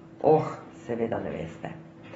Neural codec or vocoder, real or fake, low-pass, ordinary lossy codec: none; real; 19.8 kHz; AAC, 24 kbps